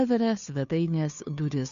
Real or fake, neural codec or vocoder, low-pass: fake; codec, 16 kHz, 2 kbps, FunCodec, trained on Chinese and English, 25 frames a second; 7.2 kHz